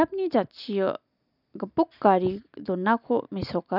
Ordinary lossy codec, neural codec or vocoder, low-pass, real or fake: none; none; 5.4 kHz; real